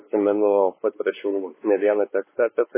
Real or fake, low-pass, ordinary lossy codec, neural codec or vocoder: fake; 3.6 kHz; MP3, 16 kbps; codec, 16 kHz, 4 kbps, X-Codec, WavLM features, trained on Multilingual LibriSpeech